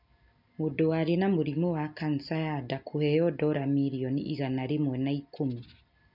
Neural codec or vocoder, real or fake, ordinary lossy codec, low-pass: none; real; none; 5.4 kHz